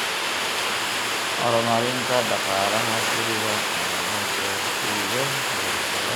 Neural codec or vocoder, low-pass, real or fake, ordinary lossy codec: none; none; real; none